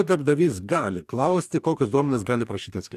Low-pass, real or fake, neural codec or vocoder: 14.4 kHz; fake; codec, 44.1 kHz, 2.6 kbps, DAC